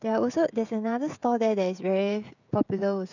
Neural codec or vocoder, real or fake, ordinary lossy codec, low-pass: codec, 16 kHz, 16 kbps, FreqCodec, smaller model; fake; none; 7.2 kHz